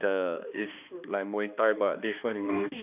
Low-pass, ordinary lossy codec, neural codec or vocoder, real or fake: 3.6 kHz; none; codec, 16 kHz, 2 kbps, X-Codec, HuBERT features, trained on balanced general audio; fake